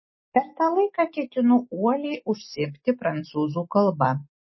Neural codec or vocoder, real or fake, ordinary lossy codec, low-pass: none; real; MP3, 24 kbps; 7.2 kHz